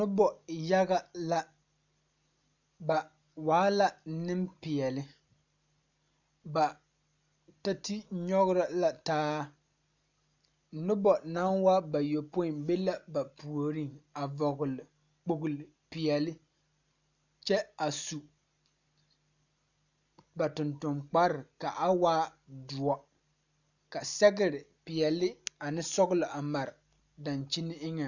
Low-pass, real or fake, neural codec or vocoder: 7.2 kHz; real; none